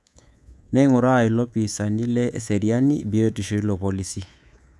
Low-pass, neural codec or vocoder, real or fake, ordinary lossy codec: none; codec, 24 kHz, 3.1 kbps, DualCodec; fake; none